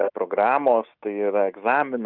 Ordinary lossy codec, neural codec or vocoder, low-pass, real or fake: Opus, 24 kbps; none; 5.4 kHz; real